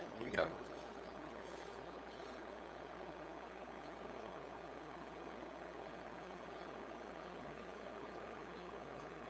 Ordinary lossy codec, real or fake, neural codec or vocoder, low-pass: none; fake; codec, 16 kHz, 8 kbps, FunCodec, trained on LibriTTS, 25 frames a second; none